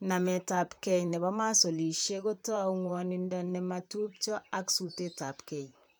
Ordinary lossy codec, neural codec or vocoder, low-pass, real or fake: none; vocoder, 44.1 kHz, 128 mel bands, Pupu-Vocoder; none; fake